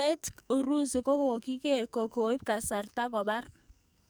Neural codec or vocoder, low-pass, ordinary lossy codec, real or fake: codec, 44.1 kHz, 2.6 kbps, SNAC; none; none; fake